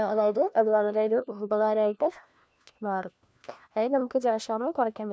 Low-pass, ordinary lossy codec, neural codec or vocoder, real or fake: none; none; codec, 16 kHz, 1 kbps, FunCodec, trained on LibriTTS, 50 frames a second; fake